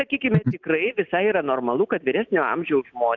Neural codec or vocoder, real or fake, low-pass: none; real; 7.2 kHz